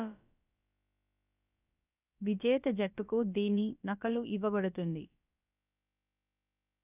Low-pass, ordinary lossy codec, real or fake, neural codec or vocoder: 3.6 kHz; none; fake; codec, 16 kHz, about 1 kbps, DyCAST, with the encoder's durations